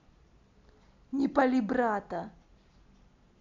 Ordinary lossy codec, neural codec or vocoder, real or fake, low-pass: none; none; real; 7.2 kHz